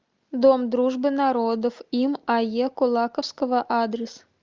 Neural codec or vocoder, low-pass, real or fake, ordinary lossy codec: none; 7.2 kHz; real; Opus, 24 kbps